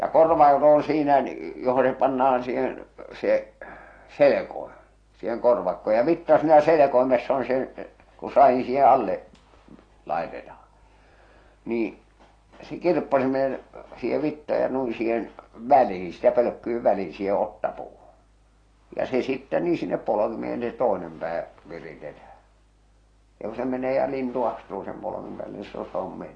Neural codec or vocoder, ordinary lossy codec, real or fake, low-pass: none; AAC, 32 kbps; real; 9.9 kHz